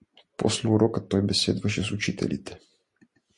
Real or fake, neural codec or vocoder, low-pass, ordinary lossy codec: real; none; 10.8 kHz; MP3, 48 kbps